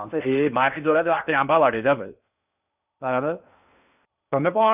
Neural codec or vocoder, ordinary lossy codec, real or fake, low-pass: codec, 16 kHz in and 24 kHz out, 0.6 kbps, FocalCodec, streaming, 4096 codes; none; fake; 3.6 kHz